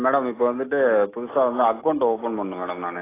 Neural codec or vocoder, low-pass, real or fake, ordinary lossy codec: none; 3.6 kHz; real; AAC, 16 kbps